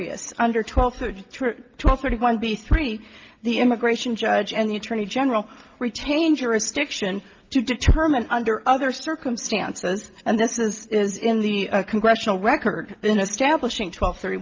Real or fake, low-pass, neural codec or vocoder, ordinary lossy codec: real; 7.2 kHz; none; Opus, 32 kbps